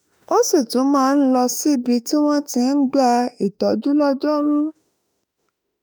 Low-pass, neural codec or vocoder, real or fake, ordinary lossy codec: none; autoencoder, 48 kHz, 32 numbers a frame, DAC-VAE, trained on Japanese speech; fake; none